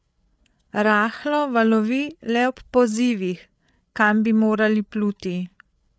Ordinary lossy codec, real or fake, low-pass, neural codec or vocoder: none; fake; none; codec, 16 kHz, 8 kbps, FreqCodec, larger model